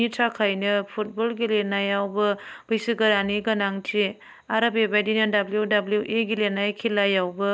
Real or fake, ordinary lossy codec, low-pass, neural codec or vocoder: real; none; none; none